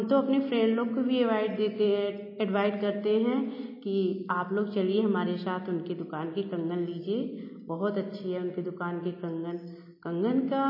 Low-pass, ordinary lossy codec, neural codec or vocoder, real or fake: 5.4 kHz; MP3, 24 kbps; none; real